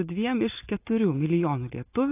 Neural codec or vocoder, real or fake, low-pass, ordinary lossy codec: none; real; 3.6 kHz; AAC, 24 kbps